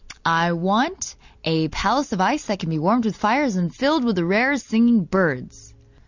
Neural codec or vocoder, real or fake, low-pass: none; real; 7.2 kHz